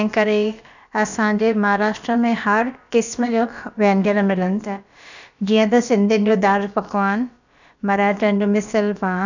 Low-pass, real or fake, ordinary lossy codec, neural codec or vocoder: 7.2 kHz; fake; none; codec, 16 kHz, about 1 kbps, DyCAST, with the encoder's durations